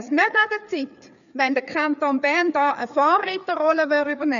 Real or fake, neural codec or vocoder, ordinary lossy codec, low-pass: fake; codec, 16 kHz, 4 kbps, FreqCodec, larger model; none; 7.2 kHz